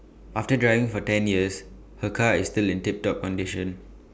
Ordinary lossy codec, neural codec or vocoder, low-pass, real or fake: none; none; none; real